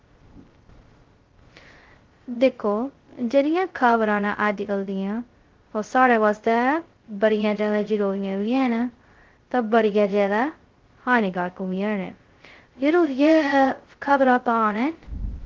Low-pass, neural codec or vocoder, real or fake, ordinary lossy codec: 7.2 kHz; codec, 16 kHz, 0.2 kbps, FocalCodec; fake; Opus, 16 kbps